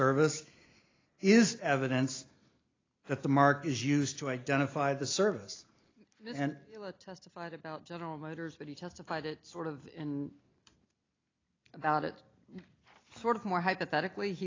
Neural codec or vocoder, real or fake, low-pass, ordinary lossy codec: none; real; 7.2 kHz; AAC, 32 kbps